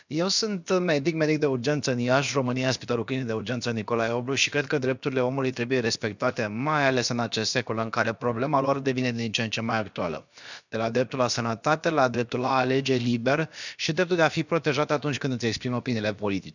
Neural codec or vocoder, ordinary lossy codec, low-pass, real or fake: codec, 16 kHz, about 1 kbps, DyCAST, with the encoder's durations; none; 7.2 kHz; fake